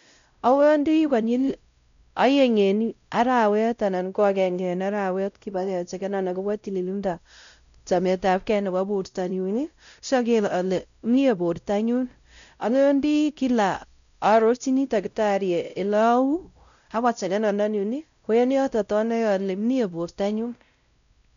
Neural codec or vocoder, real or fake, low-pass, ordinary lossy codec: codec, 16 kHz, 0.5 kbps, X-Codec, WavLM features, trained on Multilingual LibriSpeech; fake; 7.2 kHz; MP3, 96 kbps